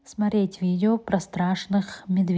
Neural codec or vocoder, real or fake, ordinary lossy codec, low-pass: none; real; none; none